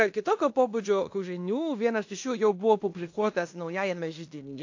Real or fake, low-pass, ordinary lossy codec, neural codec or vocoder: fake; 7.2 kHz; AAC, 48 kbps; codec, 16 kHz in and 24 kHz out, 0.9 kbps, LongCat-Audio-Codec, fine tuned four codebook decoder